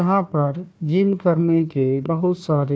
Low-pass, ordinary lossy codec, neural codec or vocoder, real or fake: none; none; codec, 16 kHz, 1 kbps, FunCodec, trained on Chinese and English, 50 frames a second; fake